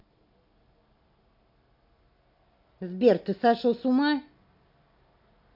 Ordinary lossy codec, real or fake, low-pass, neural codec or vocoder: MP3, 48 kbps; real; 5.4 kHz; none